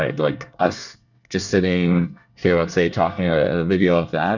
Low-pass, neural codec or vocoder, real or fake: 7.2 kHz; codec, 24 kHz, 1 kbps, SNAC; fake